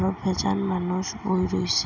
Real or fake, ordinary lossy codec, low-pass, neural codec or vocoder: real; none; 7.2 kHz; none